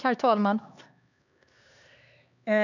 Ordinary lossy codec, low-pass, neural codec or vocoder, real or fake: none; 7.2 kHz; codec, 16 kHz, 2 kbps, X-Codec, HuBERT features, trained on LibriSpeech; fake